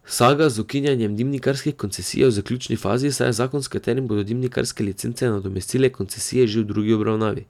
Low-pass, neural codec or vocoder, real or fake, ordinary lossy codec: 19.8 kHz; none; real; none